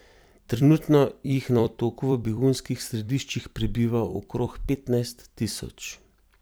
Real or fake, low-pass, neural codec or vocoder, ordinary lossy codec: fake; none; vocoder, 44.1 kHz, 128 mel bands every 256 samples, BigVGAN v2; none